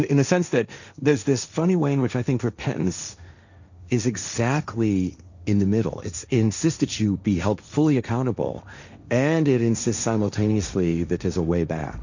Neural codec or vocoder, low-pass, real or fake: codec, 16 kHz, 1.1 kbps, Voila-Tokenizer; 7.2 kHz; fake